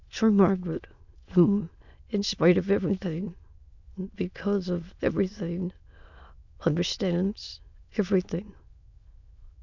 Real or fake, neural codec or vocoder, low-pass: fake; autoencoder, 22.05 kHz, a latent of 192 numbers a frame, VITS, trained on many speakers; 7.2 kHz